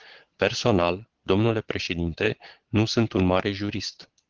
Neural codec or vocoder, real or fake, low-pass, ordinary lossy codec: vocoder, 22.05 kHz, 80 mel bands, Vocos; fake; 7.2 kHz; Opus, 16 kbps